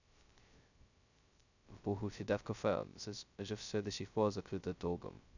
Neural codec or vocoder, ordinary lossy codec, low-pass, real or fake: codec, 16 kHz, 0.2 kbps, FocalCodec; none; 7.2 kHz; fake